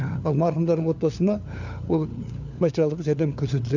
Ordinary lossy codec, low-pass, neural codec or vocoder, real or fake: none; 7.2 kHz; codec, 16 kHz, 4 kbps, FunCodec, trained on LibriTTS, 50 frames a second; fake